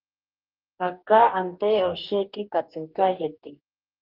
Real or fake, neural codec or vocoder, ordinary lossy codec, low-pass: fake; codec, 44.1 kHz, 2.6 kbps, DAC; Opus, 32 kbps; 5.4 kHz